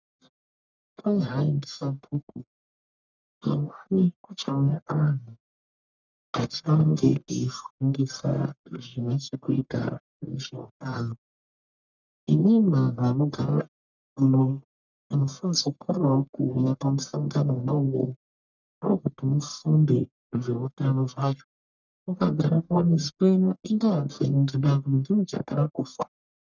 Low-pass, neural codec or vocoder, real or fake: 7.2 kHz; codec, 44.1 kHz, 1.7 kbps, Pupu-Codec; fake